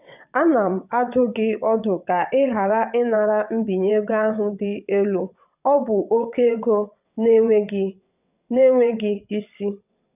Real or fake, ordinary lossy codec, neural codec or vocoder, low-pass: fake; none; vocoder, 22.05 kHz, 80 mel bands, WaveNeXt; 3.6 kHz